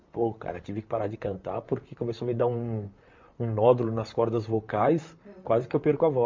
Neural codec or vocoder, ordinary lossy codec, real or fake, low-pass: vocoder, 44.1 kHz, 128 mel bands, Pupu-Vocoder; MP3, 64 kbps; fake; 7.2 kHz